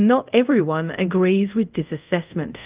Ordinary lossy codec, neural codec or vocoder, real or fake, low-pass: Opus, 24 kbps; codec, 24 kHz, 0.5 kbps, DualCodec; fake; 3.6 kHz